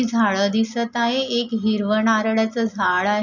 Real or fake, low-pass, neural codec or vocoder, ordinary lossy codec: real; 7.2 kHz; none; none